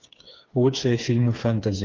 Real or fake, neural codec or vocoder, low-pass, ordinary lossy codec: fake; codec, 16 kHz, 4 kbps, FreqCodec, smaller model; 7.2 kHz; Opus, 32 kbps